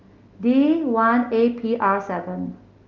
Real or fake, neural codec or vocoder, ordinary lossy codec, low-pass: real; none; Opus, 24 kbps; 7.2 kHz